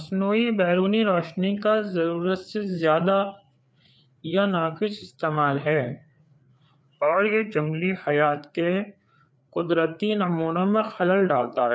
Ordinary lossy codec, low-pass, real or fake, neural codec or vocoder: none; none; fake; codec, 16 kHz, 4 kbps, FreqCodec, larger model